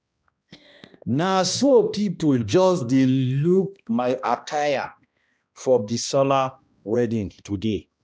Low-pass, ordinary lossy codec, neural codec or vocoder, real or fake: none; none; codec, 16 kHz, 1 kbps, X-Codec, HuBERT features, trained on balanced general audio; fake